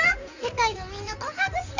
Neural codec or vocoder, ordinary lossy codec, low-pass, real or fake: codec, 16 kHz in and 24 kHz out, 2.2 kbps, FireRedTTS-2 codec; none; 7.2 kHz; fake